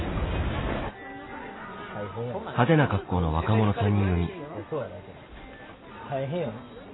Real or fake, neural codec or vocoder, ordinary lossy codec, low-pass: real; none; AAC, 16 kbps; 7.2 kHz